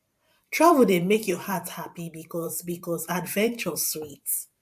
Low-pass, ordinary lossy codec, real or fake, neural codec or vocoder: 14.4 kHz; AAC, 96 kbps; fake; vocoder, 44.1 kHz, 128 mel bands every 512 samples, BigVGAN v2